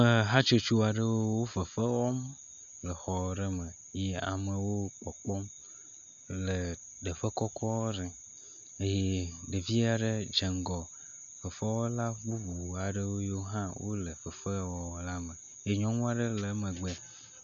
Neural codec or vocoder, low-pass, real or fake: none; 7.2 kHz; real